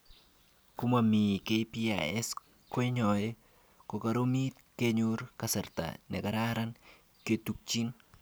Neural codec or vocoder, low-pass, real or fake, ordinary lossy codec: none; none; real; none